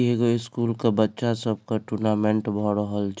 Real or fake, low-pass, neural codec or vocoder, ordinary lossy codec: real; none; none; none